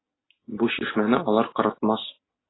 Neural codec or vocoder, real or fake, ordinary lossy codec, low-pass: none; real; AAC, 16 kbps; 7.2 kHz